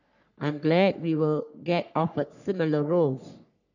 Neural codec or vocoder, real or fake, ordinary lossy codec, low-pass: codec, 44.1 kHz, 3.4 kbps, Pupu-Codec; fake; none; 7.2 kHz